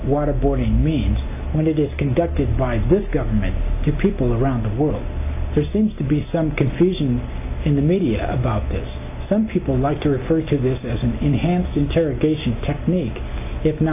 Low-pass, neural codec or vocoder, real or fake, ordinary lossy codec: 3.6 kHz; none; real; AAC, 24 kbps